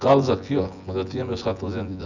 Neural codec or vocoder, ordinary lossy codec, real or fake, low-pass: vocoder, 24 kHz, 100 mel bands, Vocos; none; fake; 7.2 kHz